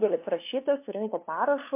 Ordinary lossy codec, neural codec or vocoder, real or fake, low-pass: MP3, 24 kbps; codec, 16 kHz, 2 kbps, FunCodec, trained on LibriTTS, 25 frames a second; fake; 3.6 kHz